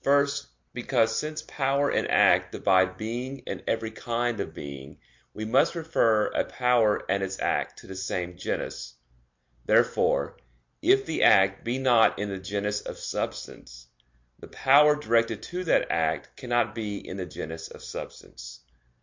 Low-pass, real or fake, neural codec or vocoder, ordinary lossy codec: 7.2 kHz; real; none; MP3, 48 kbps